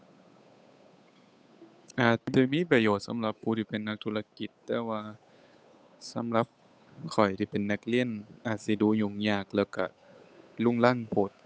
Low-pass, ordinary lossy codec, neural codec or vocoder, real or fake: none; none; codec, 16 kHz, 8 kbps, FunCodec, trained on Chinese and English, 25 frames a second; fake